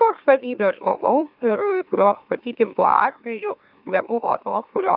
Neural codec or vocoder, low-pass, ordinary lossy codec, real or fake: autoencoder, 44.1 kHz, a latent of 192 numbers a frame, MeloTTS; 5.4 kHz; AAC, 48 kbps; fake